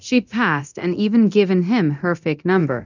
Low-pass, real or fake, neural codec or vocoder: 7.2 kHz; fake; codec, 24 kHz, 0.9 kbps, DualCodec